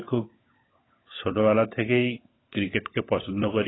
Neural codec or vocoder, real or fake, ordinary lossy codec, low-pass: codec, 16 kHz, 16 kbps, FunCodec, trained on LibriTTS, 50 frames a second; fake; AAC, 16 kbps; 7.2 kHz